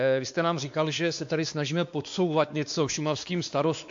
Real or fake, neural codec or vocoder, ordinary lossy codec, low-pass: fake; codec, 16 kHz, 2 kbps, X-Codec, WavLM features, trained on Multilingual LibriSpeech; AAC, 64 kbps; 7.2 kHz